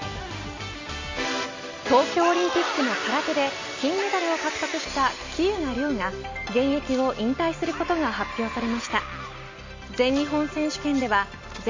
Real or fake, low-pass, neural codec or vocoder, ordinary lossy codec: real; 7.2 kHz; none; MP3, 48 kbps